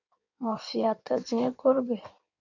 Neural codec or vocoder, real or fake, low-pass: codec, 16 kHz in and 24 kHz out, 1.1 kbps, FireRedTTS-2 codec; fake; 7.2 kHz